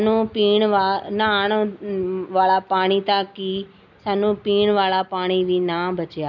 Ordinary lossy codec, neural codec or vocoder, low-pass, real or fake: none; none; 7.2 kHz; real